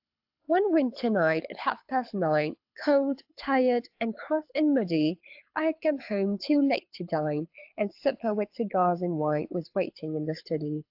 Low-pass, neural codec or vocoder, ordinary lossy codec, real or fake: 5.4 kHz; codec, 24 kHz, 6 kbps, HILCodec; AAC, 48 kbps; fake